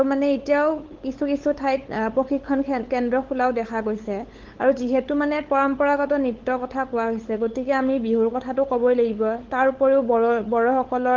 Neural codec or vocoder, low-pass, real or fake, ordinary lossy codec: codec, 16 kHz, 8 kbps, FunCodec, trained on LibriTTS, 25 frames a second; 7.2 kHz; fake; Opus, 16 kbps